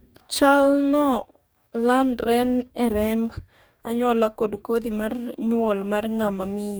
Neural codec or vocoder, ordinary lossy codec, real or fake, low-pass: codec, 44.1 kHz, 2.6 kbps, DAC; none; fake; none